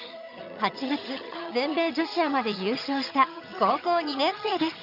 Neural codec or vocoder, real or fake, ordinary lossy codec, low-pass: vocoder, 22.05 kHz, 80 mel bands, HiFi-GAN; fake; none; 5.4 kHz